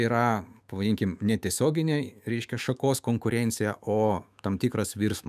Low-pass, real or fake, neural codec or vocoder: 14.4 kHz; fake; autoencoder, 48 kHz, 128 numbers a frame, DAC-VAE, trained on Japanese speech